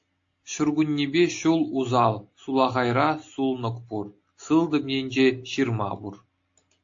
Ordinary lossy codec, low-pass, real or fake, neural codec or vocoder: AAC, 48 kbps; 7.2 kHz; real; none